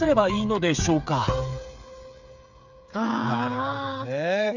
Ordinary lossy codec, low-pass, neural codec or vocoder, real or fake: none; 7.2 kHz; codec, 16 kHz, 8 kbps, FreqCodec, smaller model; fake